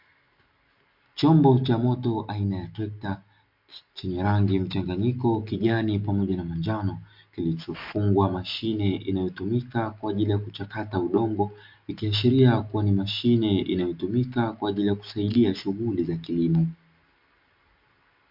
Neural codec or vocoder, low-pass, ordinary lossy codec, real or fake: none; 5.4 kHz; MP3, 48 kbps; real